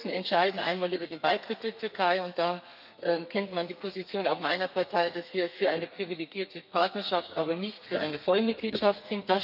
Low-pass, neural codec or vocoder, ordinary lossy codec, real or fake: 5.4 kHz; codec, 32 kHz, 1.9 kbps, SNAC; none; fake